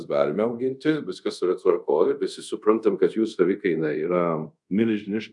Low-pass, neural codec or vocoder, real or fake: 10.8 kHz; codec, 24 kHz, 0.5 kbps, DualCodec; fake